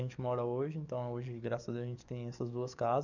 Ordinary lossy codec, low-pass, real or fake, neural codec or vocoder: none; 7.2 kHz; fake; codec, 44.1 kHz, 7.8 kbps, DAC